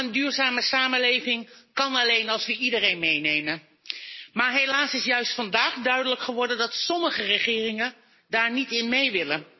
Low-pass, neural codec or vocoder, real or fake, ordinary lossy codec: 7.2 kHz; none; real; MP3, 24 kbps